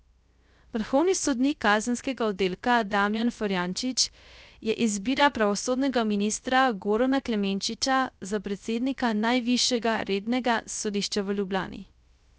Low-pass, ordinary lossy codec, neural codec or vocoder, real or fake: none; none; codec, 16 kHz, 0.3 kbps, FocalCodec; fake